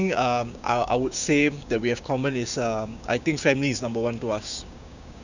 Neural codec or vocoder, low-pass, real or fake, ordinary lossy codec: codec, 16 kHz, 6 kbps, DAC; 7.2 kHz; fake; none